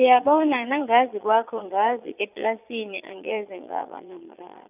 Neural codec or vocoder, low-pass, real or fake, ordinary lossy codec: vocoder, 22.05 kHz, 80 mel bands, Vocos; 3.6 kHz; fake; none